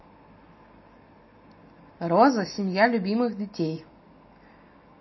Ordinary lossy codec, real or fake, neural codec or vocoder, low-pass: MP3, 24 kbps; real; none; 7.2 kHz